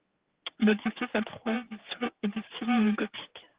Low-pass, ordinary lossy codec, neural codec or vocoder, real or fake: 3.6 kHz; Opus, 16 kbps; codec, 16 kHz, 4 kbps, X-Codec, HuBERT features, trained on general audio; fake